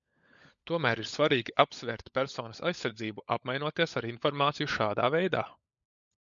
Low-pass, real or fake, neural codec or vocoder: 7.2 kHz; fake; codec, 16 kHz, 16 kbps, FunCodec, trained on LibriTTS, 50 frames a second